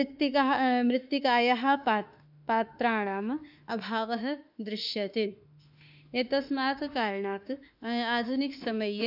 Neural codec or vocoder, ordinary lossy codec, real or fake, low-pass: autoencoder, 48 kHz, 32 numbers a frame, DAC-VAE, trained on Japanese speech; none; fake; 5.4 kHz